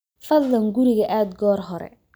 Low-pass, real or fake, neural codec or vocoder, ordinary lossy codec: none; real; none; none